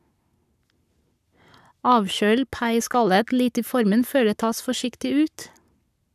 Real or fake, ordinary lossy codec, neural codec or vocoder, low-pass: fake; none; codec, 44.1 kHz, 7.8 kbps, Pupu-Codec; 14.4 kHz